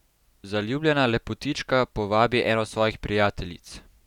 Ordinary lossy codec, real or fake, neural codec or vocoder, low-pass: none; real; none; 19.8 kHz